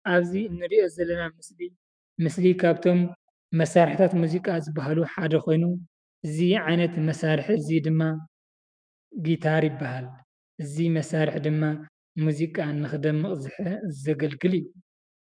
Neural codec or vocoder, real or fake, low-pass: autoencoder, 48 kHz, 128 numbers a frame, DAC-VAE, trained on Japanese speech; fake; 9.9 kHz